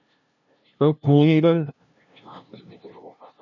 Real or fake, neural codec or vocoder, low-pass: fake; codec, 16 kHz, 0.5 kbps, FunCodec, trained on LibriTTS, 25 frames a second; 7.2 kHz